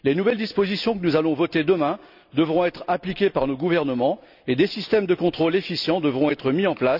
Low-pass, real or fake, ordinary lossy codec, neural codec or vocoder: 5.4 kHz; real; none; none